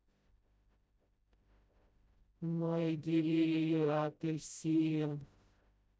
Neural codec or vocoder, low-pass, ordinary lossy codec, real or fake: codec, 16 kHz, 0.5 kbps, FreqCodec, smaller model; none; none; fake